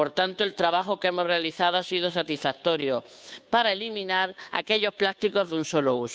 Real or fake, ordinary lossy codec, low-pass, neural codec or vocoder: fake; none; none; codec, 16 kHz, 2 kbps, FunCodec, trained on Chinese and English, 25 frames a second